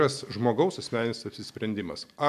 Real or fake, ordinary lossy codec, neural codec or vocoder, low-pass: real; MP3, 96 kbps; none; 14.4 kHz